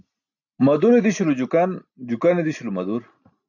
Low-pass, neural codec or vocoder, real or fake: 7.2 kHz; none; real